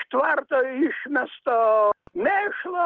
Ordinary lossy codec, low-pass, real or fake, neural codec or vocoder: Opus, 16 kbps; 7.2 kHz; real; none